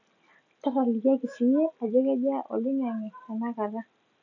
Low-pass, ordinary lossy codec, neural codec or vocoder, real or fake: 7.2 kHz; none; none; real